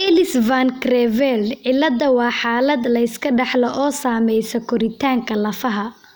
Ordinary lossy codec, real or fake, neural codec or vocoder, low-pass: none; real; none; none